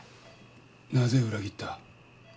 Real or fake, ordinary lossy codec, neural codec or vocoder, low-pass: real; none; none; none